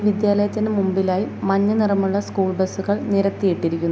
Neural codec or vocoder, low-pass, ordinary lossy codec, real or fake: none; none; none; real